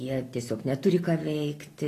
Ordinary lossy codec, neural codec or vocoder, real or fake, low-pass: AAC, 48 kbps; none; real; 14.4 kHz